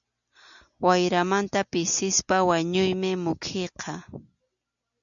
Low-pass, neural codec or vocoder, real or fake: 7.2 kHz; none; real